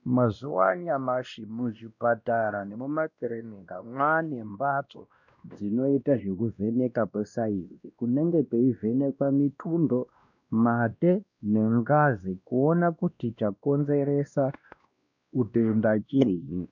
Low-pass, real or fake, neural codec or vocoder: 7.2 kHz; fake; codec, 16 kHz, 1 kbps, X-Codec, WavLM features, trained on Multilingual LibriSpeech